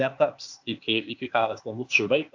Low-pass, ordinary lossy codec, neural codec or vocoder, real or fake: 7.2 kHz; AAC, 48 kbps; codec, 16 kHz, 0.8 kbps, ZipCodec; fake